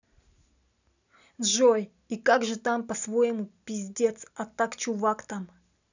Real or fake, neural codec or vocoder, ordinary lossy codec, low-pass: real; none; none; 7.2 kHz